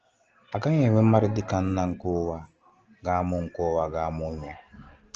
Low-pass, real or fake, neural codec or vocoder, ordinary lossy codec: 7.2 kHz; real; none; Opus, 16 kbps